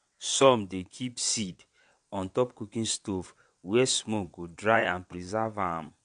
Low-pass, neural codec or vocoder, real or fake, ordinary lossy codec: 9.9 kHz; vocoder, 22.05 kHz, 80 mel bands, WaveNeXt; fake; MP3, 64 kbps